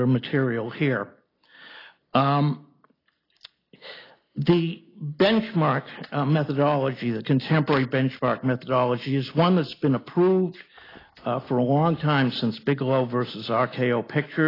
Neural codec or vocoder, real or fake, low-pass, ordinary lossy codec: none; real; 5.4 kHz; AAC, 24 kbps